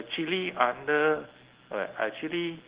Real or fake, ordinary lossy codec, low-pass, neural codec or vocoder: real; Opus, 16 kbps; 3.6 kHz; none